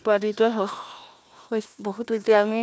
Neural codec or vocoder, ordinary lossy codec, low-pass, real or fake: codec, 16 kHz, 1 kbps, FunCodec, trained on Chinese and English, 50 frames a second; none; none; fake